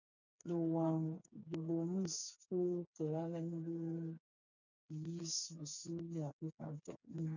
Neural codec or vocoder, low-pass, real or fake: codec, 16 kHz, 4 kbps, FreqCodec, smaller model; 7.2 kHz; fake